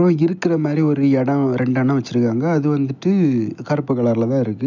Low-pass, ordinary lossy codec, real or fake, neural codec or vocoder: 7.2 kHz; none; real; none